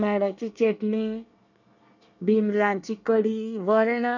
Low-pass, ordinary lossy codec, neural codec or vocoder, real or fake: 7.2 kHz; none; codec, 24 kHz, 1 kbps, SNAC; fake